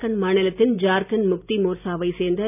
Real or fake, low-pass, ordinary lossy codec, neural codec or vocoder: real; 3.6 kHz; none; none